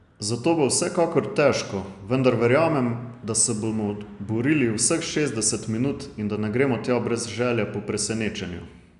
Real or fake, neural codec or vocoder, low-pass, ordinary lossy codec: real; none; 10.8 kHz; none